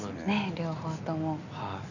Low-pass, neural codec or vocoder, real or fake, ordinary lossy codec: 7.2 kHz; none; real; none